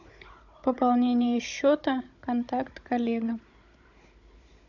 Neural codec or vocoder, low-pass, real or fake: codec, 16 kHz, 16 kbps, FunCodec, trained on Chinese and English, 50 frames a second; 7.2 kHz; fake